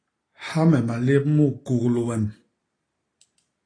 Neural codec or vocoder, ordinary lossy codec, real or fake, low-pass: none; AAC, 32 kbps; real; 9.9 kHz